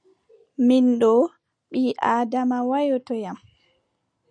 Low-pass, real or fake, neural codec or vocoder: 9.9 kHz; real; none